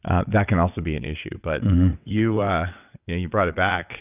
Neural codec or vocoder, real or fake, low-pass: vocoder, 22.05 kHz, 80 mel bands, WaveNeXt; fake; 3.6 kHz